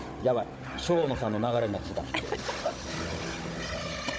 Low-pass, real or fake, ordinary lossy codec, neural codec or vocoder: none; fake; none; codec, 16 kHz, 16 kbps, FunCodec, trained on Chinese and English, 50 frames a second